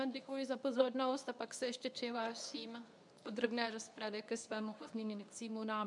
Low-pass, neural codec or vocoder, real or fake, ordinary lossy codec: 10.8 kHz; codec, 24 kHz, 0.9 kbps, WavTokenizer, medium speech release version 2; fake; MP3, 96 kbps